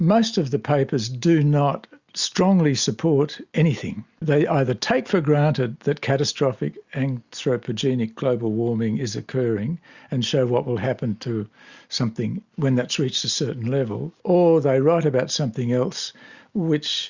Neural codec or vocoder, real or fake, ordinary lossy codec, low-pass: none; real; Opus, 64 kbps; 7.2 kHz